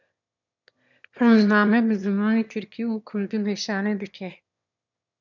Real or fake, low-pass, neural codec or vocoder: fake; 7.2 kHz; autoencoder, 22.05 kHz, a latent of 192 numbers a frame, VITS, trained on one speaker